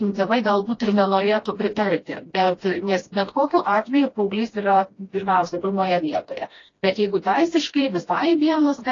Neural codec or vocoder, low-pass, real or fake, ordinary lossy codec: codec, 16 kHz, 1 kbps, FreqCodec, smaller model; 7.2 kHz; fake; AAC, 32 kbps